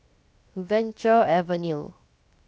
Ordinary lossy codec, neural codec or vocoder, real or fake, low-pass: none; codec, 16 kHz, 0.7 kbps, FocalCodec; fake; none